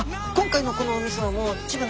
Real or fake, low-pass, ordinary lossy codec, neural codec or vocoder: real; none; none; none